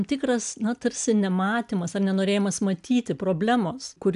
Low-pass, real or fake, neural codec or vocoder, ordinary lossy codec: 10.8 kHz; real; none; AAC, 96 kbps